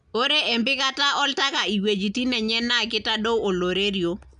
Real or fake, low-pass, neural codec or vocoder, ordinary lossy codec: real; 9.9 kHz; none; none